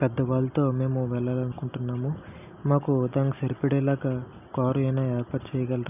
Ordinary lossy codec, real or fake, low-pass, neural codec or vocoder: none; real; 3.6 kHz; none